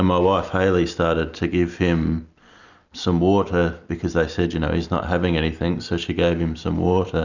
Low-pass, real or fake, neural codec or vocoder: 7.2 kHz; real; none